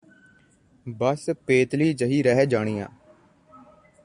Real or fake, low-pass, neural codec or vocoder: real; 9.9 kHz; none